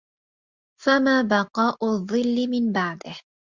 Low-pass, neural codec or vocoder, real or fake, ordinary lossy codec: 7.2 kHz; none; real; Opus, 64 kbps